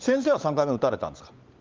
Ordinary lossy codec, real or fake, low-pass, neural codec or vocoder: Opus, 32 kbps; fake; 7.2 kHz; codec, 16 kHz, 16 kbps, FunCodec, trained on LibriTTS, 50 frames a second